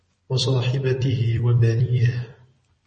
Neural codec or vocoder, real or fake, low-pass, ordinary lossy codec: vocoder, 44.1 kHz, 128 mel bands every 256 samples, BigVGAN v2; fake; 10.8 kHz; MP3, 32 kbps